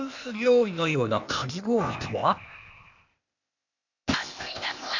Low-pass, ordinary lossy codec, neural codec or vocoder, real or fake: 7.2 kHz; none; codec, 16 kHz, 0.8 kbps, ZipCodec; fake